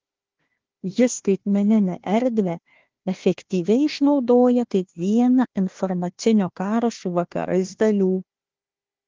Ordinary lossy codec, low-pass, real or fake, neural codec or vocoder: Opus, 16 kbps; 7.2 kHz; fake; codec, 16 kHz, 1 kbps, FunCodec, trained on Chinese and English, 50 frames a second